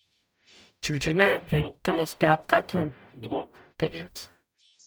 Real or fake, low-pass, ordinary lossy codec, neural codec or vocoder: fake; none; none; codec, 44.1 kHz, 0.9 kbps, DAC